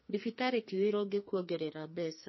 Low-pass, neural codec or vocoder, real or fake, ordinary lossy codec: 7.2 kHz; codec, 44.1 kHz, 2.6 kbps, SNAC; fake; MP3, 24 kbps